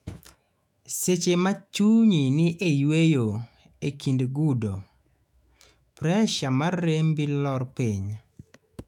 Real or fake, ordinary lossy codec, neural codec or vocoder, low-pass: fake; none; autoencoder, 48 kHz, 128 numbers a frame, DAC-VAE, trained on Japanese speech; 19.8 kHz